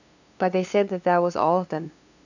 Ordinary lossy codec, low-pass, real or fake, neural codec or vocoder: none; 7.2 kHz; fake; codec, 16 kHz, 2 kbps, FunCodec, trained on LibriTTS, 25 frames a second